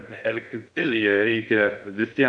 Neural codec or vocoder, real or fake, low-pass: codec, 16 kHz in and 24 kHz out, 0.6 kbps, FocalCodec, streaming, 2048 codes; fake; 9.9 kHz